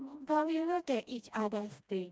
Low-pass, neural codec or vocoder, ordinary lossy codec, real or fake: none; codec, 16 kHz, 1 kbps, FreqCodec, smaller model; none; fake